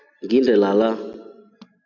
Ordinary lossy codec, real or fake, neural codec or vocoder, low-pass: MP3, 64 kbps; real; none; 7.2 kHz